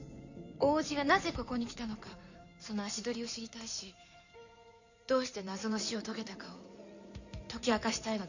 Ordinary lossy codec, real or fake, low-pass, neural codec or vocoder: none; fake; 7.2 kHz; codec, 16 kHz in and 24 kHz out, 2.2 kbps, FireRedTTS-2 codec